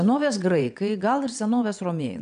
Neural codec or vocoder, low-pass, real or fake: vocoder, 22.05 kHz, 80 mel bands, WaveNeXt; 9.9 kHz; fake